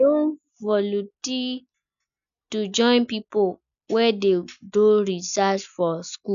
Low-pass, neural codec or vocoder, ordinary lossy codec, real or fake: 7.2 kHz; none; none; real